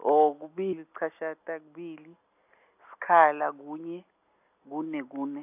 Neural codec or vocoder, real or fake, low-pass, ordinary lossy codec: none; real; 3.6 kHz; AAC, 32 kbps